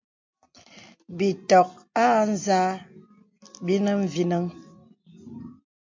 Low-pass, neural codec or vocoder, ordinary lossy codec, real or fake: 7.2 kHz; none; MP3, 48 kbps; real